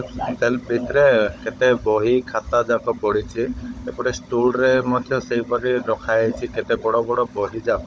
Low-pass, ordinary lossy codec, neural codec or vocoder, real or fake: none; none; codec, 16 kHz, 16 kbps, FunCodec, trained on Chinese and English, 50 frames a second; fake